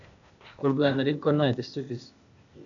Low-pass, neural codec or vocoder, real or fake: 7.2 kHz; codec, 16 kHz, 0.8 kbps, ZipCodec; fake